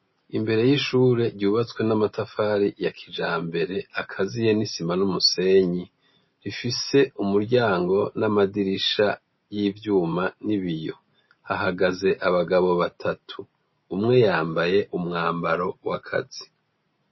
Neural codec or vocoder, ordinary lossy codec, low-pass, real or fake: none; MP3, 24 kbps; 7.2 kHz; real